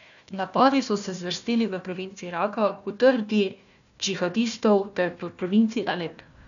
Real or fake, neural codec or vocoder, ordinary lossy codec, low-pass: fake; codec, 16 kHz, 1 kbps, FunCodec, trained on Chinese and English, 50 frames a second; none; 7.2 kHz